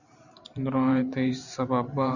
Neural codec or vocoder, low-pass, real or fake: vocoder, 44.1 kHz, 128 mel bands every 512 samples, BigVGAN v2; 7.2 kHz; fake